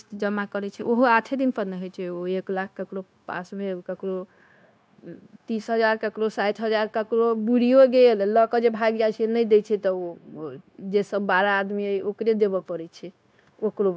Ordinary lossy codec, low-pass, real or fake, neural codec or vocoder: none; none; fake; codec, 16 kHz, 0.9 kbps, LongCat-Audio-Codec